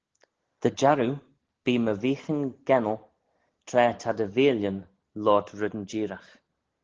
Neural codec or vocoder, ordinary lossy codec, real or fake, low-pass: none; Opus, 16 kbps; real; 7.2 kHz